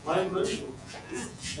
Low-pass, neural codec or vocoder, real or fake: 10.8 kHz; vocoder, 48 kHz, 128 mel bands, Vocos; fake